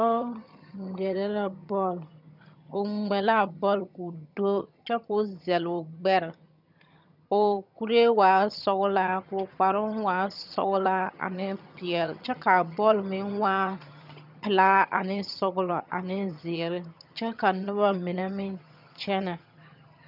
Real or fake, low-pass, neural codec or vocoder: fake; 5.4 kHz; vocoder, 22.05 kHz, 80 mel bands, HiFi-GAN